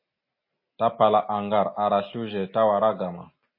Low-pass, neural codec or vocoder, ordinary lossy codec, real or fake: 5.4 kHz; none; MP3, 24 kbps; real